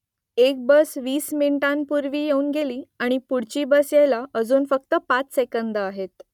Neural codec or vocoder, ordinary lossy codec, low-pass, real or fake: none; none; 19.8 kHz; real